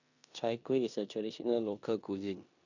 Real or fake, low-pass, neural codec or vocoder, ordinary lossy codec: fake; 7.2 kHz; codec, 16 kHz in and 24 kHz out, 0.9 kbps, LongCat-Audio-Codec, four codebook decoder; none